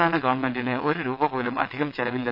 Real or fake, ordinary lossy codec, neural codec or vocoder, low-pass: fake; none; vocoder, 22.05 kHz, 80 mel bands, WaveNeXt; 5.4 kHz